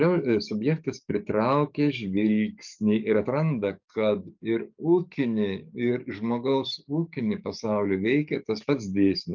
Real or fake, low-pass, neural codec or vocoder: fake; 7.2 kHz; codec, 44.1 kHz, 7.8 kbps, DAC